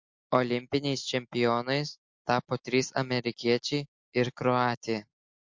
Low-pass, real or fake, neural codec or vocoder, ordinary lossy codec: 7.2 kHz; real; none; MP3, 48 kbps